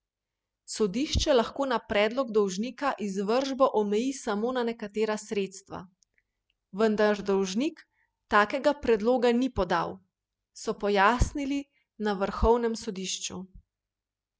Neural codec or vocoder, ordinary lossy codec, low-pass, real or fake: none; none; none; real